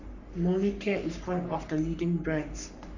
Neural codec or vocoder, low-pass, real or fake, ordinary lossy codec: codec, 44.1 kHz, 3.4 kbps, Pupu-Codec; 7.2 kHz; fake; none